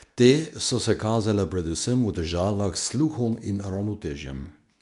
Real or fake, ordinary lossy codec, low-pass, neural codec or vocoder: fake; none; 10.8 kHz; codec, 24 kHz, 0.9 kbps, WavTokenizer, medium speech release version 2